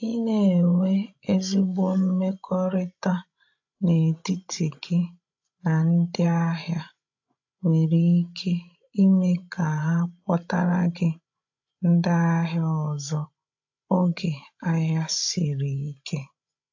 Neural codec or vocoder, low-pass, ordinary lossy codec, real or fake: codec, 16 kHz, 16 kbps, FreqCodec, larger model; 7.2 kHz; AAC, 48 kbps; fake